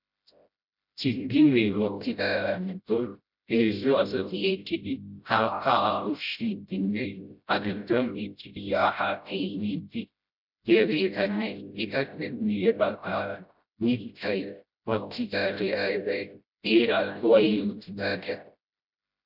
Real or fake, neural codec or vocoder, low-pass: fake; codec, 16 kHz, 0.5 kbps, FreqCodec, smaller model; 5.4 kHz